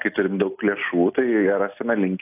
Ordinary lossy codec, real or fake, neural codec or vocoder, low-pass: AAC, 32 kbps; real; none; 3.6 kHz